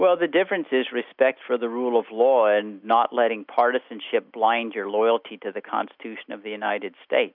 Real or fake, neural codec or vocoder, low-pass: real; none; 5.4 kHz